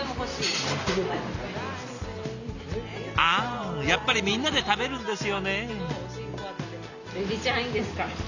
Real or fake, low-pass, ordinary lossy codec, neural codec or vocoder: real; 7.2 kHz; none; none